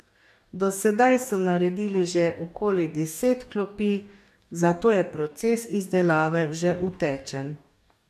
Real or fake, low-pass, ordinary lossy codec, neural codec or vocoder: fake; 14.4 kHz; none; codec, 44.1 kHz, 2.6 kbps, DAC